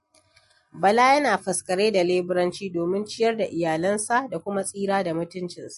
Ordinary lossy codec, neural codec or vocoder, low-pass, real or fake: MP3, 48 kbps; none; 14.4 kHz; real